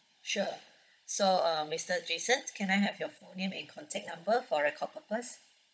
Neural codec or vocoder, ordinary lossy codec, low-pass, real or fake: codec, 16 kHz, 16 kbps, FunCodec, trained on Chinese and English, 50 frames a second; none; none; fake